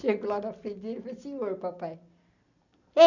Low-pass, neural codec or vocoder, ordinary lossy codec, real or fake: 7.2 kHz; none; none; real